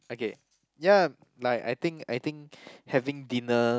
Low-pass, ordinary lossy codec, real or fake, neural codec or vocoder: none; none; real; none